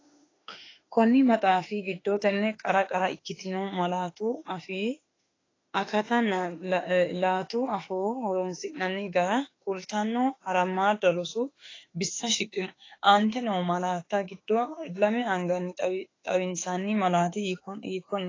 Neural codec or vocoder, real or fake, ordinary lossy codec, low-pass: autoencoder, 48 kHz, 32 numbers a frame, DAC-VAE, trained on Japanese speech; fake; AAC, 32 kbps; 7.2 kHz